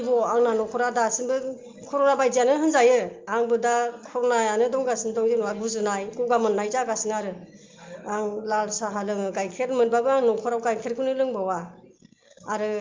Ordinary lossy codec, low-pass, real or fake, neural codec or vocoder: Opus, 24 kbps; 7.2 kHz; real; none